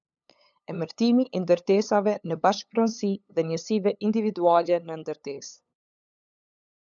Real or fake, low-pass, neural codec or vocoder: fake; 7.2 kHz; codec, 16 kHz, 8 kbps, FunCodec, trained on LibriTTS, 25 frames a second